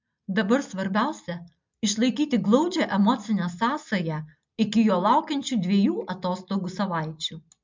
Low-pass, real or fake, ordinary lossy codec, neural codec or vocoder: 7.2 kHz; real; MP3, 64 kbps; none